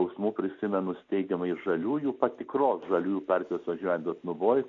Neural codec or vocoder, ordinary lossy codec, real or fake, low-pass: none; AAC, 64 kbps; real; 7.2 kHz